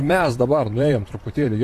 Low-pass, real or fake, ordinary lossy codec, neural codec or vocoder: 14.4 kHz; fake; AAC, 48 kbps; vocoder, 44.1 kHz, 128 mel bands, Pupu-Vocoder